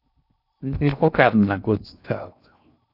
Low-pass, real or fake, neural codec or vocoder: 5.4 kHz; fake; codec, 16 kHz in and 24 kHz out, 0.6 kbps, FocalCodec, streaming, 4096 codes